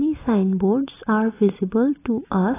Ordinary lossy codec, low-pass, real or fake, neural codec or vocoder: AAC, 16 kbps; 3.6 kHz; fake; vocoder, 44.1 kHz, 128 mel bands every 256 samples, BigVGAN v2